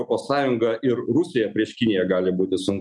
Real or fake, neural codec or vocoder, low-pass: real; none; 10.8 kHz